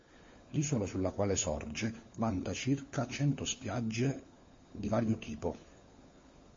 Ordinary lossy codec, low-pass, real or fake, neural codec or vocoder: MP3, 32 kbps; 7.2 kHz; fake; codec, 16 kHz, 4 kbps, FunCodec, trained on LibriTTS, 50 frames a second